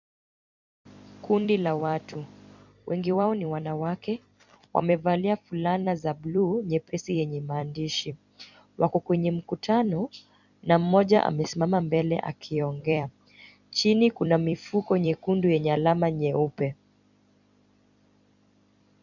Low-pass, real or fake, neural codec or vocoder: 7.2 kHz; fake; vocoder, 44.1 kHz, 128 mel bands every 256 samples, BigVGAN v2